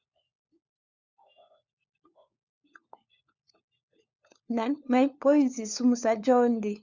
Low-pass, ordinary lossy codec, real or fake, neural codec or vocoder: 7.2 kHz; Opus, 64 kbps; fake; codec, 16 kHz, 4 kbps, FunCodec, trained on LibriTTS, 50 frames a second